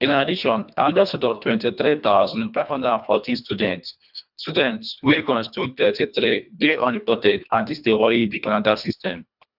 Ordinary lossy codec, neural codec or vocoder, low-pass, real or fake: none; codec, 24 kHz, 1.5 kbps, HILCodec; 5.4 kHz; fake